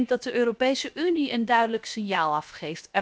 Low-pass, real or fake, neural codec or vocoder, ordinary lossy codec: none; fake; codec, 16 kHz, 0.7 kbps, FocalCodec; none